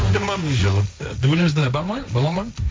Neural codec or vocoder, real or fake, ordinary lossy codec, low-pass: codec, 16 kHz, 1.1 kbps, Voila-Tokenizer; fake; none; none